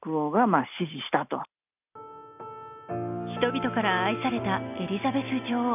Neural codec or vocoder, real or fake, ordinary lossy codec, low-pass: none; real; none; 3.6 kHz